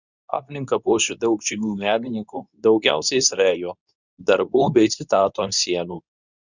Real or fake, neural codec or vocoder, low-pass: fake; codec, 24 kHz, 0.9 kbps, WavTokenizer, medium speech release version 2; 7.2 kHz